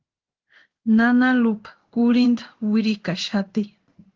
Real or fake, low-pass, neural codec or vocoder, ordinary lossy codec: fake; 7.2 kHz; codec, 16 kHz in and 24 kHz out, 1 kbps, XY-Tokenizer; Opus, 16 kbps